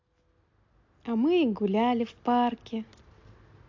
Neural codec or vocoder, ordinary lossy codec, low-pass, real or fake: none; AAC, 48 kbps; 7.2 kHz; real